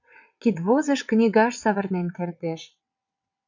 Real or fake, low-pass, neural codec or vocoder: fake; 7.2 kHz; vocoder, 22.05 kHz, 80 mel bands, WaveNeXt